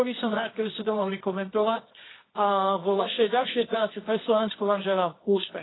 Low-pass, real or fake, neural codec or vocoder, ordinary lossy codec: 7.2 kHz; fake; codec, 24 kHz, 0.9 kbps, WavTokenizer, medium music audio release; AAC, 16 kbps